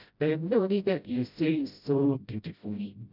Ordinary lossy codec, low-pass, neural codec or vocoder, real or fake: none; 5.4 kHz; codec, 16 kHz, 0.5 kbps, FreqCodec, smaller model; fake